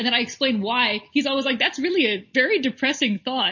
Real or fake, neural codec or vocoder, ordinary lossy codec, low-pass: real; none; MP3, 32 kbps; 7.2 kHz